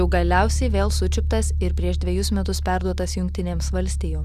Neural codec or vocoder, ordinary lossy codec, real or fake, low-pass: none; Opus, 64 kbps; real; 14.4 kHz